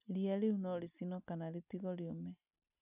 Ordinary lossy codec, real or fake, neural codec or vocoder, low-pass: none; real; none; 3.6 kHz